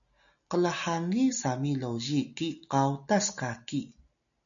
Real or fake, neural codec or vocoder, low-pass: real; none; 7.2 kHz